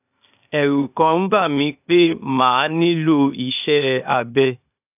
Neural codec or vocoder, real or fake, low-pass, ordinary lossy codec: codec, 16 kHz, 0.8 kbps, ZipCodec; fake; 3.6 kHz; none